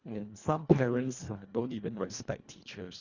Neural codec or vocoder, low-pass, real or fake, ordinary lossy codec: codec, 24 kHz, 1.5 kbps, HILCodec; 7.2 kHz; fake; Opus, 64 kbps